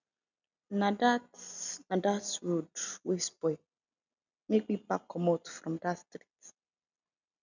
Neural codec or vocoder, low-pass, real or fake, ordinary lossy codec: none; 7.2 kHz; real; none